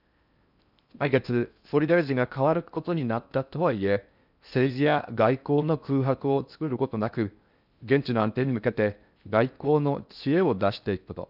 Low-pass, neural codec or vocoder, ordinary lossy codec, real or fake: 5.4 kHz; codec, 16 kHz in and 24 kHz out, 0.6 kbps, FocalCodec, streaming, 4096 codes; none; fake